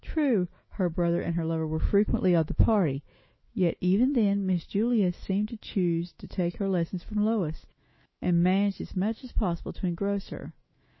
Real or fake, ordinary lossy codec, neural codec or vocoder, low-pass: fake; MP3, 24 kbps; autoencoder, 48 kHz, 128 numbers a frame, DAC-VAE, trained on Japanese speech; 7.2 kHz